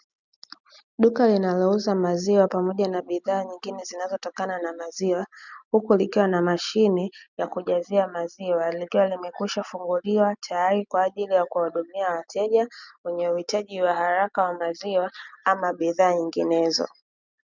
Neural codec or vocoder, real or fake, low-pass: none; real; 7.2 kHz